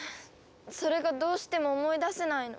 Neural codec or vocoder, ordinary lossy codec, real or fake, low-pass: none; none; real; none